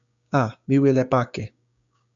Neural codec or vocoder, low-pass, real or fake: codec, 16 kHz, 6 kbps, DAC; 7.2 kHz; fake